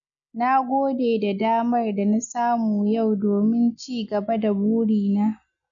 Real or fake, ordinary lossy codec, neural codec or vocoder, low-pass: real; MP3, 96 kbps; none; 7.2 kHz